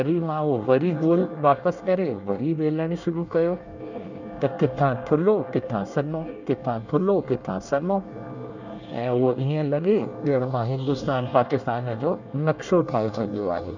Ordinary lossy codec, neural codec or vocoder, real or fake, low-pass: none; codec, 24 kHz, 1 kbps, SNAC; fake; 7.2 kHz